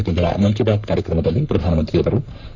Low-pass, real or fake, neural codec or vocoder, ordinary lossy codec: 7.2 kHz; fake; codec, 44.1 kHz, 3.4 kbps, Pupu-Codec; none